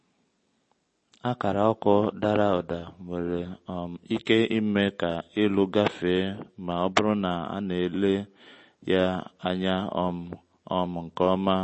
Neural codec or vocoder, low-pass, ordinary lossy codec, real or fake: none; 10.8 kHz; MP3, 32 kbps; real